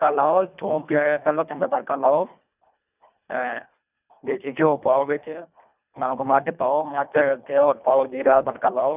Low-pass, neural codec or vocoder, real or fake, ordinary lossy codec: 3.6 kHz; codec, 24 kHz, 1.5 kbps, HILCodec; fake; none